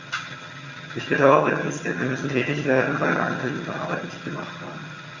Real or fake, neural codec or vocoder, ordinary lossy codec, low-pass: fake; vocoder, 22.05 kHz, 80 mel bands, HiFi-GAN; Opus, 64 kbps; 7.2 kHz